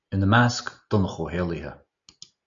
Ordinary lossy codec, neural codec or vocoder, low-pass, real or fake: AAC, 64 kbps; none; 7.2 kHz; real